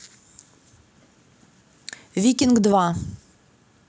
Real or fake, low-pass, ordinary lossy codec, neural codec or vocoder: real; none; none; none